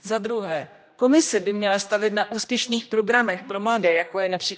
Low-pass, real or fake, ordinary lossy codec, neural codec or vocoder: none; fake; none; codec, 16 kHz, 1 kbps, X-Codec, HuBERT features, trained on general audio